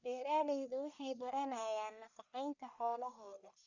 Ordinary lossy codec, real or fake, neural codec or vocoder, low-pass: Opus, 64 kbps; fake; codec, 44.1 kHz, 1.7 kbps, Pupu-Codec; 7.2 kHz